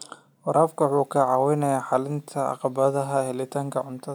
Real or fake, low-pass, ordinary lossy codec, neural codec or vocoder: real; none; none; none